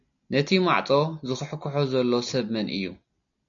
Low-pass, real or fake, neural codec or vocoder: 7.2 kHz; real; none